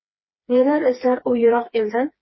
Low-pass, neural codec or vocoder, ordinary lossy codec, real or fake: 7.2 kHz; codec, 16 kHz, 4 kbps, FreqCodec, smaller model; MP3, 24 kbps; fake